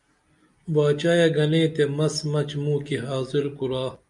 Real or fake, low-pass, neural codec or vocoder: real; 10.8 kHz; none